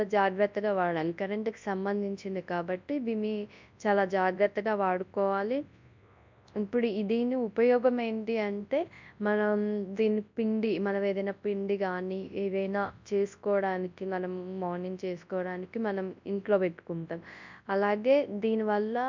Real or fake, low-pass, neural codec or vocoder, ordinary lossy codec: fake; 7.2 kHz; codec, 24 kHz, 0.9 kbps, WavTokenizer, large speech release; MP3, 64 kbps